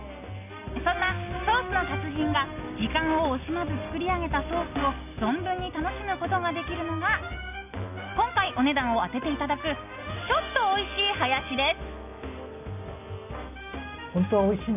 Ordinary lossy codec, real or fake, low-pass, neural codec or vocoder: none; real; 3.6 kHz; none